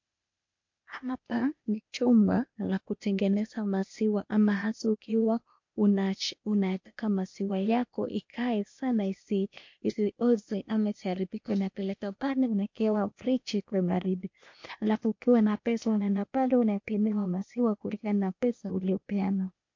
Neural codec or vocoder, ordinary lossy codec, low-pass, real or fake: codec, 16 kHz, 0.8 kbps, ZipCodec; MP3, 48 kbps; 7.2 kHz; fake